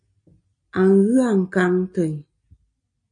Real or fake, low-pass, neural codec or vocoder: real; 9.9 kHz; none